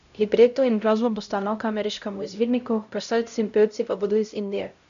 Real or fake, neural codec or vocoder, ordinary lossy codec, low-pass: fake; codec, 16 kHz, 0.5 kbps, X-Codec, HuBERT features, trained on LibriSpeech; none; 7.2 kHz